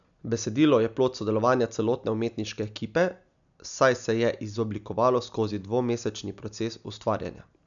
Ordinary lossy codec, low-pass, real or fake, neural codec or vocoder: none; 7.2 kHz; real; none